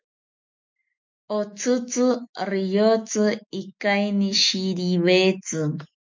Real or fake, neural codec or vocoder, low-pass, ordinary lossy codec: fake; autoencoder, 48 kHz, 128 numbers a frame, DAC-VAE, trained on Japanese speech; 7.2 kHz; MP3, 48 kbps